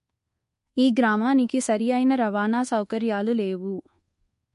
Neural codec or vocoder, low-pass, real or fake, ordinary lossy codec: codec, 24 kHz, 1.2 kbps, DualCodec; 10.8 kHz; fake; MP3, 48 kbps